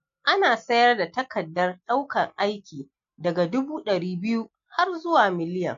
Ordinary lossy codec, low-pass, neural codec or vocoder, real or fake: MP3, 48 kbps; 7.2 kHz; none; real